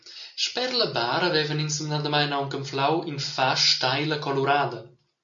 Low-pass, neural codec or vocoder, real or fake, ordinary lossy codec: 7.2 kHz; none; real; MP3, 96 kbps